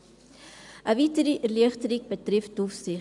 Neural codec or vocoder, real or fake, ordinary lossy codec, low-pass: none; real; none; 10.8 kHz